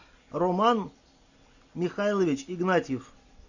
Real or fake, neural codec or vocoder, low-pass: real; none; 7.2 kHz